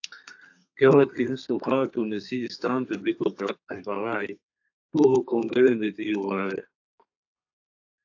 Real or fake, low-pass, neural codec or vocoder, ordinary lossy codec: fake; 7.2 kHz; codec, 32 kHz, 1.9 kbps, SNAC; AAC, 48 kbps